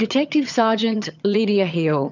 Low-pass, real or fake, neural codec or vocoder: 7.2 kHz; fake; vocoder, 22.05 kHz, 80 mel bands, HiFi-GAN